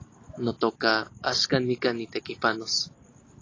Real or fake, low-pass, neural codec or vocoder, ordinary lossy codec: real; 7.2 kHz; none; AAC, 32 kbps